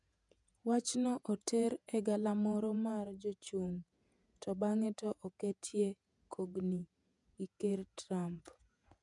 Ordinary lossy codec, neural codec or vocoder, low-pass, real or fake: none; vocoder, 48 kHz, 128 mel bands, Vocos; 10.8 kHz; fake